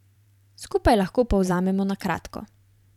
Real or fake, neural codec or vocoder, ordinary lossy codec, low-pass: fake; vocoder, 44.1 kHz, 128 mel bands every 512 samples, BigVGAN v2; none; 19.8 kHz